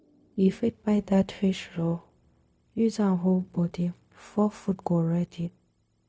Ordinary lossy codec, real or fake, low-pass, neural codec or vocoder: none; fake; none; codec, 16 kHz, 0.4 kbps, LongCat-Audio-Codec